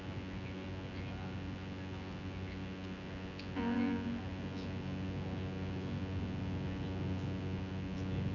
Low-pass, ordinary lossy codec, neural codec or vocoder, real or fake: 7.2 kHz; none; vocoder, 24 kHz, 100 mel bands, Vocos; fake